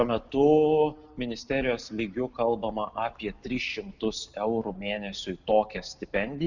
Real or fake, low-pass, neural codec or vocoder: real; 7.2 kHz; none